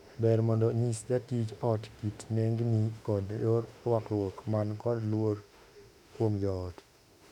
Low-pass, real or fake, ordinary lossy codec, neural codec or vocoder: 19.8 kHz; fake; none; autoencoder, 48 kHz, 32 numbers a frame, DAC-VAE, trained on Japanese speech